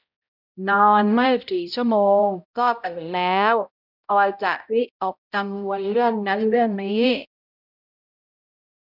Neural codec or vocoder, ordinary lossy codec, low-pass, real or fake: codec, 16 kHz, 0.5 kbps, X-Codec, HuBERT features, trained on balanced general audio; none; 5.4 kHz; fake